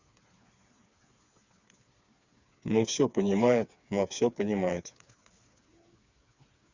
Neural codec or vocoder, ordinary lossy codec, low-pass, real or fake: codec, 16 kHz, 4 kbps, FreqCodec, smaller model; Opus, 64 kbps; 7.2 kHz; fake